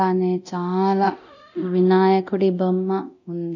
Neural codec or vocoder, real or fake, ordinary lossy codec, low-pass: codec, 24 kHz, 0.9 kbps, DualCodec; fake; none; 7.2 kHz